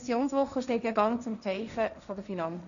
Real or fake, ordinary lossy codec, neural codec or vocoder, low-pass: fake; none; codec, 16 kHz, 1.1 kbps, Voila-Tokenizer; 7.2 kHz